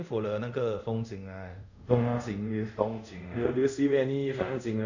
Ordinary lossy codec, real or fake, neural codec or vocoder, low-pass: none; fake; codec, 24 kHz, 0.5 kbps, DualCodec; 7.2 kHz